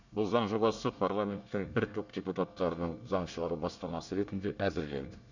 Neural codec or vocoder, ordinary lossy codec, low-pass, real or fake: codec, 24 kHz, 1 kbps, SNAC; none; 7.2 kHz; fake